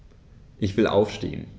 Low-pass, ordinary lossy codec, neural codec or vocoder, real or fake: none; none; none; real